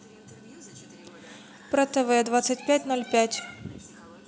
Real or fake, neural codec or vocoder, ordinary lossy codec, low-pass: real; none; none; none